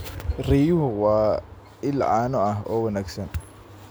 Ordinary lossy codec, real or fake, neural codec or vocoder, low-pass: none; real; none; none